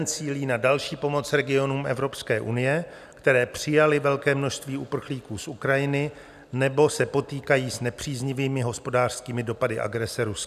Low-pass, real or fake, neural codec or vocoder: 14.4 kHz; real; none